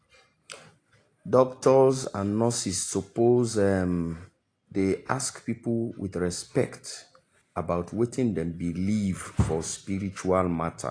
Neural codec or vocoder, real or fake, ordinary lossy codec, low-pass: none; real; AAC, 48 kbps; 9.9 kHz